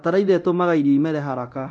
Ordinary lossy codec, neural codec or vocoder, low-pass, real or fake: MP3, 64 kbps; codec, 24 kHz, 0.9 kbps, DualCodec; 9.9 kHz; fake